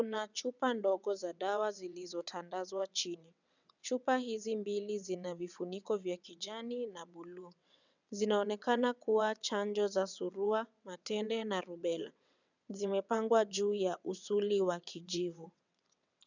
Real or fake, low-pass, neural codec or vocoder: fake; 7.2 kHz; vocoder, 22.05 kHz, 80 mel bands, WaveNeXt